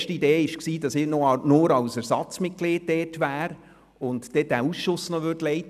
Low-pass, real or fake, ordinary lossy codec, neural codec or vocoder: 14.4 kHz; real; none; none